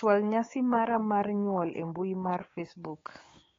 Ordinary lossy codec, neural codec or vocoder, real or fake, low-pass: AAC, 32 kbps; codec, 16 kHz, 6 kbps, DAC; fake; 7.2 kHz